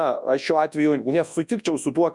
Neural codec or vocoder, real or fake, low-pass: codec, 24 kHz, 0.9 kbps, WavTokenizer, large speech release; fake; 10.8 kHz